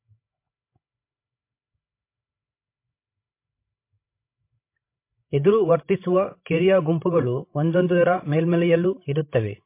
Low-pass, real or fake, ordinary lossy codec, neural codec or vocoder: 3.6 kHz; fake; MP3, 24 kbps; codec, 16 kHz, 16 kbps, FreqCodec, larger model